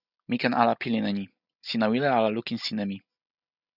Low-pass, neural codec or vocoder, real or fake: 5.4 kHz; none; real